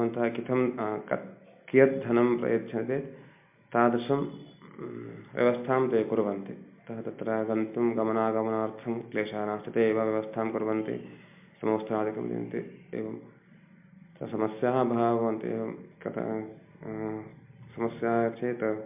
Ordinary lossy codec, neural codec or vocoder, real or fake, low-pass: MP3, 32 kbps; none; real; 3.6 kHz